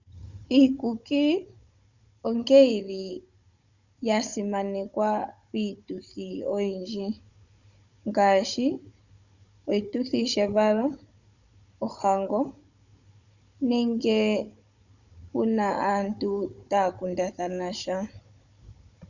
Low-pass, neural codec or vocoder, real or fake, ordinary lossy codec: 7.2 kHz; codec, 16 kHz, 16 kbps, FunCodec, trained on Chinese and English, 50 frames a second; fake; Opus, 64 kbps